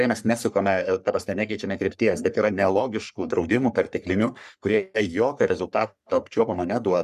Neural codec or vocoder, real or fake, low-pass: codec, 44.1 kHz, 3.4 kbps, Pupu-Codec; fake; 14.4 kHz